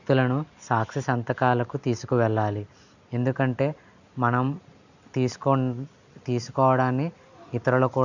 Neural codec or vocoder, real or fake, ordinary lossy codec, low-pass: none; real; none; 7.2 kHz